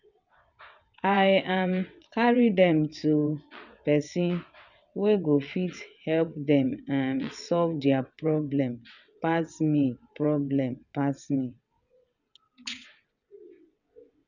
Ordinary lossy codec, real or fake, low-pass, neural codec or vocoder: none; fake; 7.2 kHz; vocoder, 22.05 kHz, 80 mel bands, WaveNeXt